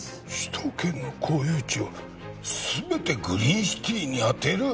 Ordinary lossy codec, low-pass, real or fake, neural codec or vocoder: none; none; real; none